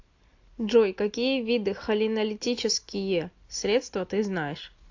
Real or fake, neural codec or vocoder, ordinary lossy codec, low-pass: real; none; AAC, 48 kbps; 7.2 kHz